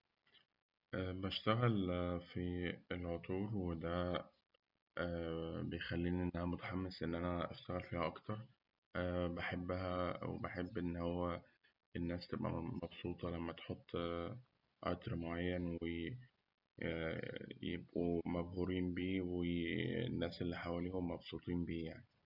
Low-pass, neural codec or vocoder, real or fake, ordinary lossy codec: 5.4 kHz; none; real; none